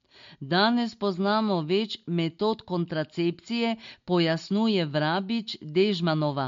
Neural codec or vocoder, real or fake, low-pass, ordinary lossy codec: none; real; 7.2 kHz; MP3, 48 kbps